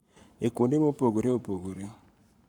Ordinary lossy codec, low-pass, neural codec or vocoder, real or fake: none; 19.8 kHz; codec, 44.1 kHz, 7.8 kbps, Pupu-Codec; fake